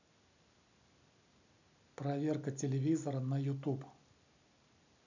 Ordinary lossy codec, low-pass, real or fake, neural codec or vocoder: none; 7.2 kHz; real; none